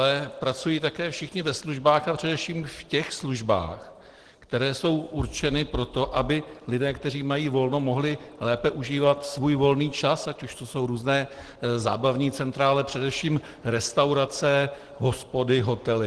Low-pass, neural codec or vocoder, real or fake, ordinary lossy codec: 10.8 kHz; none; real; Opus, 16 kbps